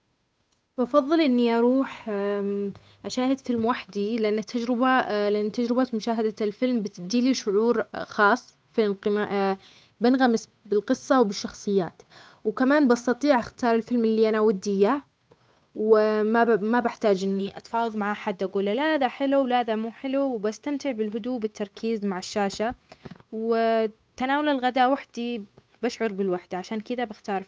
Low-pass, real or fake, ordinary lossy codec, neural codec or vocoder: none; fake; none; codec, 16 kHz, 8 kbps, FunCodec, trained on Chinese and English, 25 frames a second